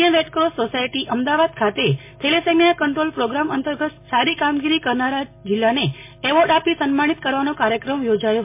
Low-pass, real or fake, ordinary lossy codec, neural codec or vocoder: 3.6 kHz; real; MP3, 24 kbps; none